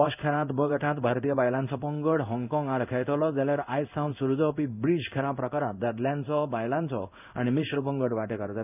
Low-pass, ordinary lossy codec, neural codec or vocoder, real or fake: 3.6 kHz; none; codec, 16 kHz in and 24 kHz out, 1 kbps, XY-Tokenizer; fake